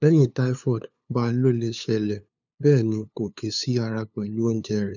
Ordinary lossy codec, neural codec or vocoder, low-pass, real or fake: none; codec, 16 kHz, 2 kbps, FunCodec, trained on LibriTTS, 25 frames a second; 7.2 kHz; fake